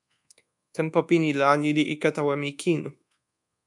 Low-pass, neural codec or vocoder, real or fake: 10.8 kHz; codec, 24 kHz, 1.2 kbps, DualCodec; fake